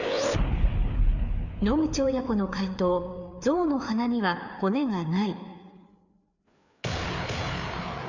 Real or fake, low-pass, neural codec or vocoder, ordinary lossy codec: fake; 7.2 kHz; codec, 16 kHz, 4 kbps, FreqCodec, larger model; none